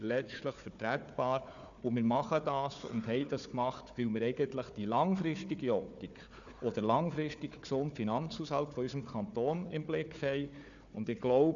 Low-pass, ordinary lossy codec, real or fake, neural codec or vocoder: 7.2 kHz; none; fake; codec, 16 kHz, 4 kbps, FunCodec, trained on Chinese and English, 50 frames a second